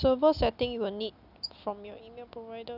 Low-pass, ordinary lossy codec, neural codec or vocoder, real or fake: 5.4 kHz; none; none; real